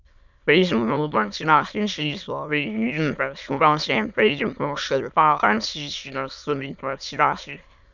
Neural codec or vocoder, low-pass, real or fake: autoencoder, 22.05 kHz, a latent of 192 numbers a frame, VITS, trained on many speakers; 7.2 kHz; fake